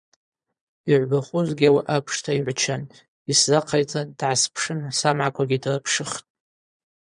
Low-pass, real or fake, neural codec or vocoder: 9.9 kHz; fake; vocoder, 22.05 kHz, 80 mel bands, Vocos